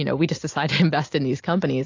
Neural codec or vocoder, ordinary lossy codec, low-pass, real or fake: none; AAC, 48 kbps; 7.2 kHz; real